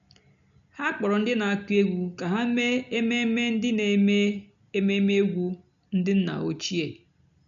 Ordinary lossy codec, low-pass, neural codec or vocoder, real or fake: none; 7.2 kHz; none; real